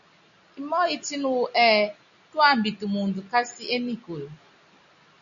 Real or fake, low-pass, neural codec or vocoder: real; 7.2 kHz; none